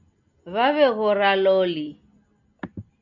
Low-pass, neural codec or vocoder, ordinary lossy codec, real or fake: 7.2 kHz; none; MP3, 64 kbps; real